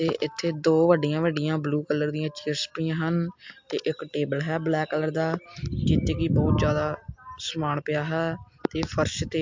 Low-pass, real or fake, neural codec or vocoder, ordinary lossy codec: 7.2 kHz; real; none; MP3, 64 kbps